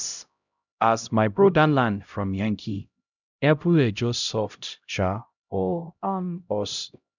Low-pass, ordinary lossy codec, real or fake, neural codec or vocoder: 7.2 kHz; none; fake; codec, 16 kHz, 0.5 kbps, X-Codec, HuBERT features, trained on LibriSpeech